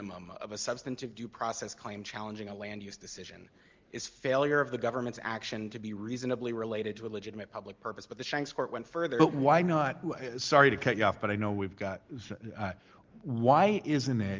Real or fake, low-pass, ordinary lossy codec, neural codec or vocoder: real; 7.2 kHz; Opus, 32 kbps; none